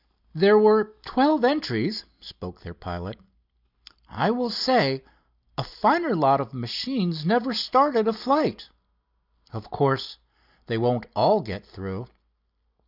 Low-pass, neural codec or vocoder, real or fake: 5.4 kHz; none; real